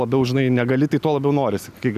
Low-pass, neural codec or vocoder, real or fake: 14.4 kHz; none; real